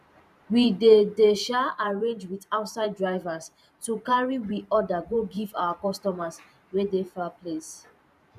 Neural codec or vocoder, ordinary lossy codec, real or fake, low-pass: vocoder, 44.1 kHz, 128 mel bands every 512 samples, BigVGAN v2; none; fake; 14.4 kHz